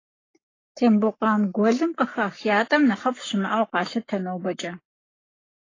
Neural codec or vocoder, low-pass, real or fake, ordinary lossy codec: codec, 44.1 kHz, 7.8 kbps, Pupu-Codec; 7.2 kHz; fake; AAC, 32 kbps